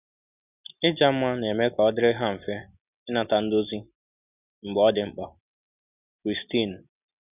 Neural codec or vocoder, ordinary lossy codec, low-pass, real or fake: none; none; 3.6 kHz; real